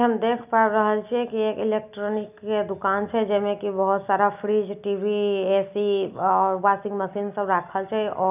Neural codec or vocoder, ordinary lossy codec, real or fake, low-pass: none; none; real; 3.6 kHz